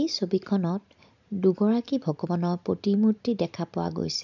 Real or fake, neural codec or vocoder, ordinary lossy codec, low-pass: fake; vocoder, 44.1 kHz, 128 mel bands every 512 samples, BigVGAN v2; none; 7.2 kHz